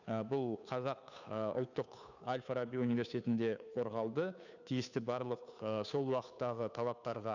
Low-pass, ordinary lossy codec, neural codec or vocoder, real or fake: 7.2 kHz; none; codec, 16 kHz, 2 kbps, FunCodec, trained on Chinese and English, 25 frames a second; fake